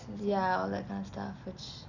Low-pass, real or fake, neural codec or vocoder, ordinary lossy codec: 7.2 kHz; real; none; none